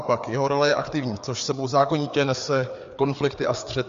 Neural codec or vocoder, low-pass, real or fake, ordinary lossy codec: codec, 16 kHz, 4 kbps, FreqCodec, larger model; 7.2 kHz; fake; MP3, 48 kbps